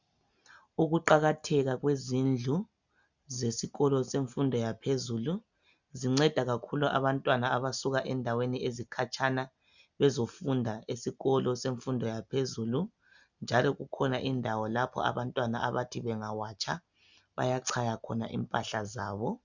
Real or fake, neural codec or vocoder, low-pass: real; none; 7.2 kHz